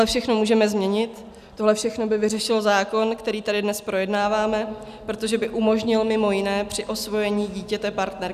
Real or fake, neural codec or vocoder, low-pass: real; none; 14.4 kHz